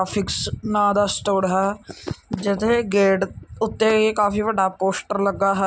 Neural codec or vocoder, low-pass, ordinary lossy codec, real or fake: none; none; none; real